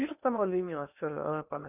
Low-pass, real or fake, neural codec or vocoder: 3.6 kHz; fake; codec, 16 kHz in and 24 kHz out, 0.8 kbps, FocalCodec, streaming, 65536 codes